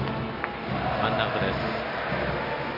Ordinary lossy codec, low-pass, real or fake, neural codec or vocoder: none; 5.4 kHz; real; none